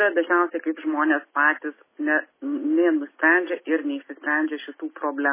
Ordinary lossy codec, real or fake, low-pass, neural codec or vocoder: MP3, 16 kbps; real; 3.6 kHz; none